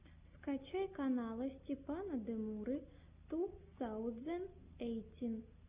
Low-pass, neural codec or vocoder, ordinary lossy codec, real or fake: 3.6 kHz; none; Opus, 64 kbps; real